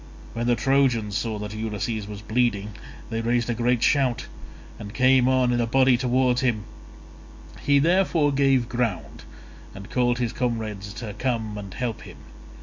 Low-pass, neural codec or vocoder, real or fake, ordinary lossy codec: 7.2 kHz; none; real; MP3, 48 kbps